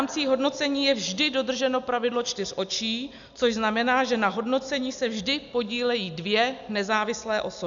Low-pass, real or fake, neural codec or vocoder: 7.2 kHz; real; none